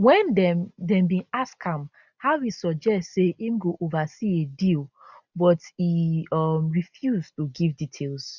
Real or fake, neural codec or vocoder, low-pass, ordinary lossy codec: real; none; 7.2 kHz; none